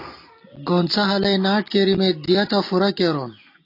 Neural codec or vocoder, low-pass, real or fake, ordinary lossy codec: none; 5.4 kHz; real; AAC, 32 kbps